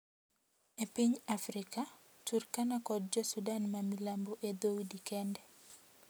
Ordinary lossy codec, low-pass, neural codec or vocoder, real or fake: none; none; none; real